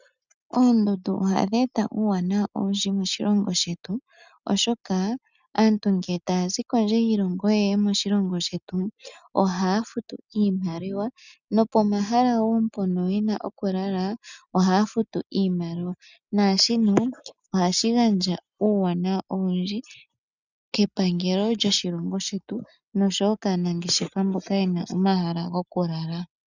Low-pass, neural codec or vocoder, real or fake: 7.2 kHz; none; real